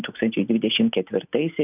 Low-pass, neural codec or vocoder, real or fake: 3.6 kHz; none; real